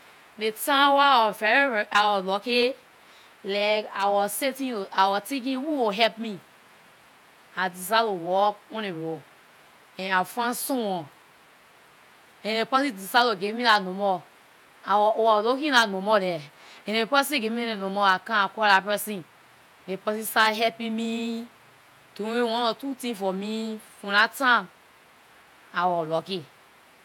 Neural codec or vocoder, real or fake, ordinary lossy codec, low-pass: vocoder, 48 kHz, 128 mel bands, Vocos; fake; none; 19.8 kHz